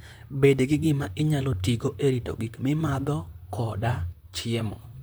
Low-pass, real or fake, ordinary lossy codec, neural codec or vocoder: none; fake; none; vocoder, 44.1 kHz, 128 mel bands, Pupu-Vocoder